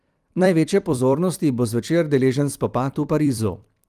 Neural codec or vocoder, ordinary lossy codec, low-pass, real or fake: vocoder, 44.1 kHz, 128 mel bands every 256 samples, BigVGAN v2; Opus, 32 kbps; 14.4 kHz; fake